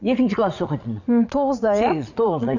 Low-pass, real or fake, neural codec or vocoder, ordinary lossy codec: 7.2 kHz; fake; vocoder, 22.05 kHz, 80 mel bands, Vocos; none